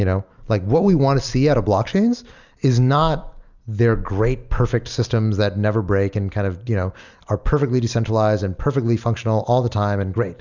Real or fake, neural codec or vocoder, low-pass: real; none; 7.2 kHz